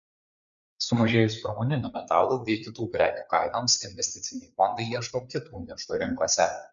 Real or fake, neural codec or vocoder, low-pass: fake; codec, 16 kHz, 4 kbps, FreqCodec, larger model; 7.2 kHz